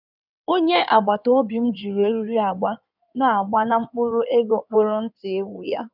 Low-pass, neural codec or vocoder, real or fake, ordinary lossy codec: 5.4 kHz; codec, 16 kHz in and 24 kHz out, 2.2 kbps, FireRedTTS-2 codec; fake; none